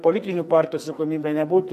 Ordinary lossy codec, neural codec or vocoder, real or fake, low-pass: MP3, 64 kbps; codec, 44.1 kHz, 2.6 kbps, SNAC; fake; 14.4 kHz